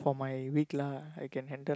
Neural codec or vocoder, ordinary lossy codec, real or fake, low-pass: none; none; real; none